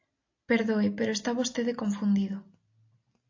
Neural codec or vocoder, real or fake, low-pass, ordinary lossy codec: none; real; 7.2 kHz; AAC, 48 kbps